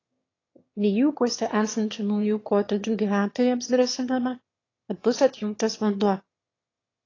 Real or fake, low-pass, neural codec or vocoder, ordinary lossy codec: fake; 7.2 kHz; autoencoder, 22.05 kHz, a latent of 192 numbers a frame, VITS, trained on one speaker; AAC, 32 kbps